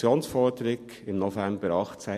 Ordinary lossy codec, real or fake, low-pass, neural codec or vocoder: MP3, 64 kbps; real; 14.4 kHz; none